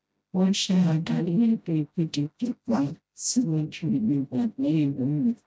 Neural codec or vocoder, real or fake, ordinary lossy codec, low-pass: codec, 16 kHz, 0.5 kbps, FreqCodec, smaller model; fake; none; none